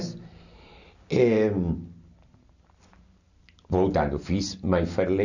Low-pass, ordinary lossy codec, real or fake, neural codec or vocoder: 7.2 kHz; none; real; none